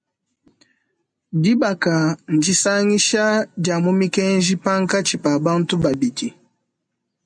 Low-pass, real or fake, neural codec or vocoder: 9.9 kHz; real; none